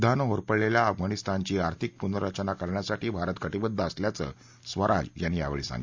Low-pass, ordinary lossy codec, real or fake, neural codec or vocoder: 7.2 kHz; MP3, 64 kbps; real; none